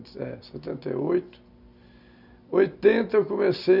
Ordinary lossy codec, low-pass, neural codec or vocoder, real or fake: none; 5.4 kHz; none; real